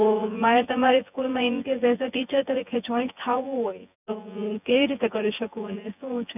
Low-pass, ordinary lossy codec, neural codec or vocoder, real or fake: 3.6 kHz; none; vocoder, 24 kHz, 100 mel bands, Vocos; fake